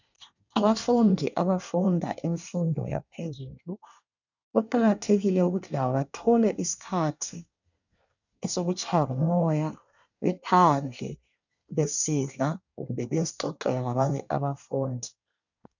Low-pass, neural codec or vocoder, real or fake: 7.2 kHz; codec, 24 kHz, 1 kbps, SNAC; fake